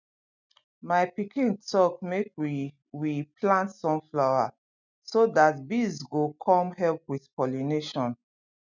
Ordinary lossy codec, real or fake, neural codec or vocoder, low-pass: none; real; none; 7.2 kHz